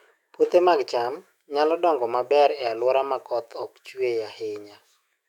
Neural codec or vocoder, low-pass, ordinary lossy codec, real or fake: autoencoder, 48 kHz, 128 numbers a frame, DAC-VAE, trained on Japanese speech; 19.8 kHz; none; fake